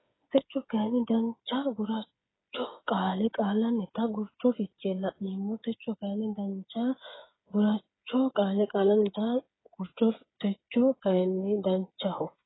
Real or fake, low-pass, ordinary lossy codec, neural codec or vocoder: fake; 7.2 kHz; AAC, 16 kbps; codec, 16 kHz, 8 kbps, FreqCodec, smaller model